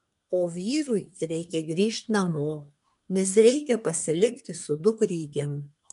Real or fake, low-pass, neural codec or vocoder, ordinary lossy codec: fake; 10.8 kHz; codec, 24 kHz, 1 kbps, SNAC; MP3, 96 kbps